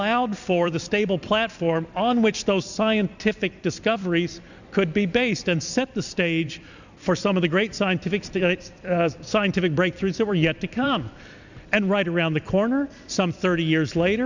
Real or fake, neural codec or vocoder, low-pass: fake; vocoder, 44.1 kHz, 128 mel bands every 256 samples, BigVGAN v2; 7.2 kHz